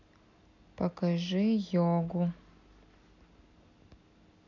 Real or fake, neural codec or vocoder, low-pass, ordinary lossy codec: real; none; 7.2 kHz; none